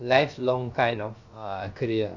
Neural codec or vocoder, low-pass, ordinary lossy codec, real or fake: codec, 16 kHz, about 1 kbps, DyCAST, with the encoder's durations; 7.2 kHz; Opus, 64 kbps; fake